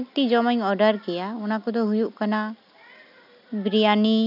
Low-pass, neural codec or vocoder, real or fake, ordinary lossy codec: 5.4 kHz; none; real; none